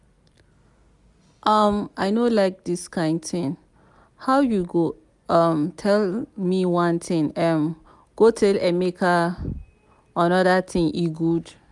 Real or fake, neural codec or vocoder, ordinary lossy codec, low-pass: real; none; none; 10.8 kHz